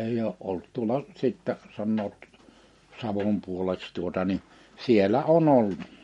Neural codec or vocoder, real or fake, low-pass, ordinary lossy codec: none; real; 19.8 kHz; MP3, 48 kbps